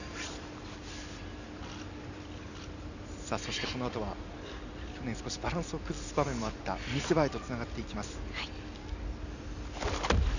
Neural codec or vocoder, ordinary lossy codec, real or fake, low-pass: none; none; real; 7.2 kHz